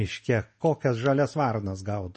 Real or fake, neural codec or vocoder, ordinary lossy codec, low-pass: real; none; MP3, 32 kbps; 9.9 kHz